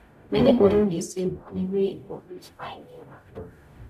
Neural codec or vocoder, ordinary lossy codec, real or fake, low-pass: codec, 44.1 kHz, 0.9 kbps, DAC; none; fake; 14.4 kHz